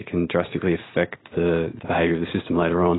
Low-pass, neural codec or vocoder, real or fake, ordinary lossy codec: 7.2 kHz; none; real; AAC, 16 kbps